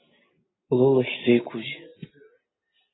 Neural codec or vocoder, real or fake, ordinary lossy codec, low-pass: none; real; AAC, 16 kbps; 7.2 kHz